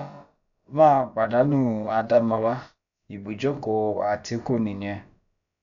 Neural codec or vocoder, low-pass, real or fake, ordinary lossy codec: codec, 16 kHz, about 1 kbps, DyCAST, with the encoder's durations; 7.2 kHz; fake; none